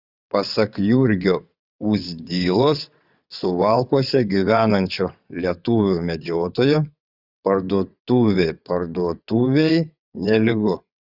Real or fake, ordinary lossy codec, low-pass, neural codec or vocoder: fake; Opus, 64 kbps; 5.4 kHz; vocoder, 22.05 kHz, 80 mel bands, WaveNeXt